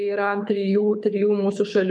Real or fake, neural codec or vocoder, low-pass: fake; codec, 24 kHz, 6 kbps, HILCodec; 9.9 kHz